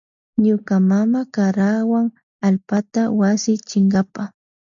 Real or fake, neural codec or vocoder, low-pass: real; none; 7.2 kHz